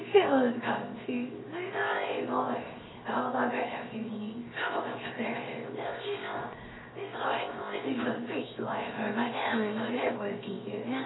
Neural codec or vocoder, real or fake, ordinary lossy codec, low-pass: codec, 16 kHz, 0.7 kbps, FocalCodec; fake; AAC, 16 kbps; 7.2 kHz